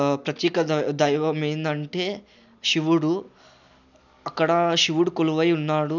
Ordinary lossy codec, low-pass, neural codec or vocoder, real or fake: none; 7.2 kHz; none; real